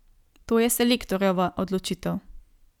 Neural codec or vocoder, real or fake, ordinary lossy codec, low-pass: none; real; none; 19.8 kHz